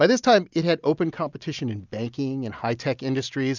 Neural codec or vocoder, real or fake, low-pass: none; real; 7.2 kHz